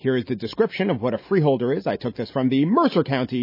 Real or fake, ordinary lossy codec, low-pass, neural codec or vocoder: real; MP3, 24 kbps; 5.4 kHz; none